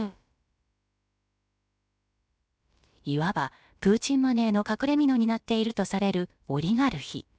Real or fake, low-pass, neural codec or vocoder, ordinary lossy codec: fake; none; codec, 16 kHz, about 1 kbps, DyCAST, with the encoder's durations; none